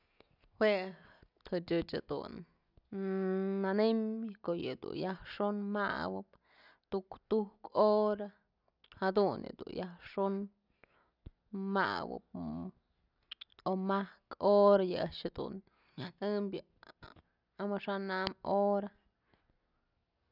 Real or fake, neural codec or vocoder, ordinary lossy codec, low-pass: real; none; none; 5.4 kHz